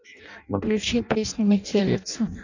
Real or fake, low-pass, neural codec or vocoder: fake; 7.2 kHz; codec, 16 kHz in and 24 kHz out, 0.6 kbps, FireRedTTS-2 codec